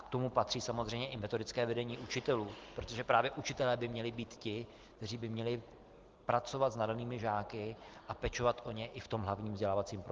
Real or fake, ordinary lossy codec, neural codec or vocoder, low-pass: real; Opus, 16 kbps; none; 7.2 kHz